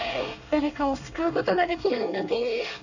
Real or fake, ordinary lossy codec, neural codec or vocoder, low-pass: fake; none; codec, 24 kHz, 1 kbps, SNAC; 7.2 kHz